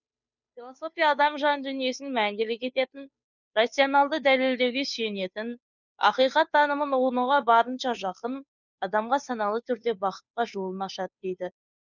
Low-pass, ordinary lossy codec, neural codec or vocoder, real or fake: 7.2 kHz; none; codec, 16 kHz, 2 kbps, FunCodec, trained on Chinese and English, 25 frames a second; fake